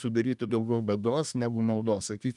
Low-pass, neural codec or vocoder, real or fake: 10.8 kHz; codec, 24 kHz, 1 kbps, SNAC; fake